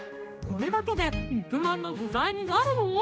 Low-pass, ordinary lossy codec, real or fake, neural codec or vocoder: none; none; fake; codec, 16 kHz, 1 kbps, X-Codec, HuBERT features, trained on general audio